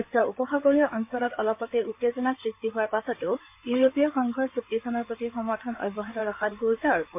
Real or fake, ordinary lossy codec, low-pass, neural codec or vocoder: fake; MP3, 32 kbps; 3.6 kHz; codec, 16 kHz in and 24 kHz out, 2.2 kbps, FireRedTTS-2 codec